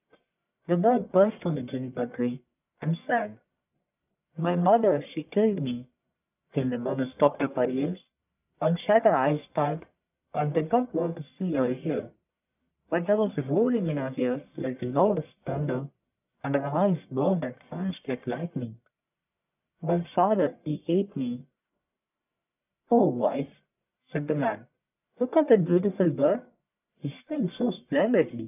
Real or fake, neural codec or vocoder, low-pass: fake; codec, 44.1 kHz, 1.7 kbps, Pupu-Codec; 3.6 kHz